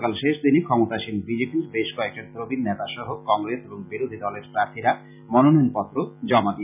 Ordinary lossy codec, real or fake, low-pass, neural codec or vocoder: none; real; 3.6 kHz; none